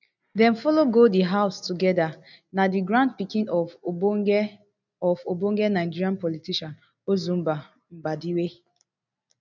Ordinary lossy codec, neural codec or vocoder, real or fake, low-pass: none; none; real; 7.2 kHz